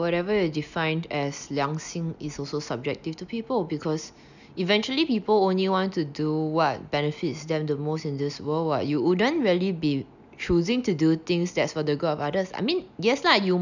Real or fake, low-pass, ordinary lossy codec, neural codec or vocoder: real; 7.2 kHz; none; none